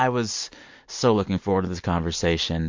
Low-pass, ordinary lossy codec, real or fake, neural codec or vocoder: 7.2 kHz; MP3, 48 kbps; real; none